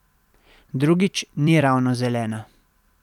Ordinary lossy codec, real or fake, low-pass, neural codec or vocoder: none; fake; 19.8 kHz; vocoder, 44.1 kHz, 128 mel bands every 256 samples, BigVGAN v2